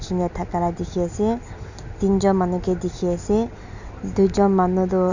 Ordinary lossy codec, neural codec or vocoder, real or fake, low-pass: none; none; real; 7.2 kHz